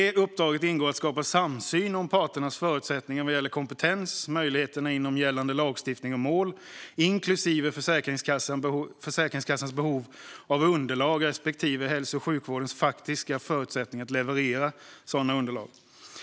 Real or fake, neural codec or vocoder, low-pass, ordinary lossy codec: real; none; none; none